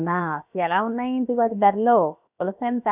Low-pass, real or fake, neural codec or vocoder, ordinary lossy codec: 3.6 kHz; fake; codec, 16 kHz, about 1 kbps, DyCAST, with the encoder's durations; none